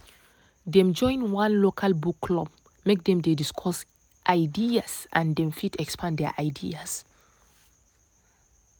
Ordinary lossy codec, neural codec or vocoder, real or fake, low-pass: none; none; real; none